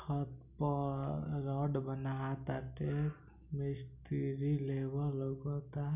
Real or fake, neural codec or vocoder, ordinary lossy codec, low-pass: real; none; none; 3.6 kHz